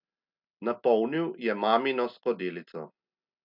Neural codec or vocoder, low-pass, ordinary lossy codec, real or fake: none; 5.4 kHz; none; real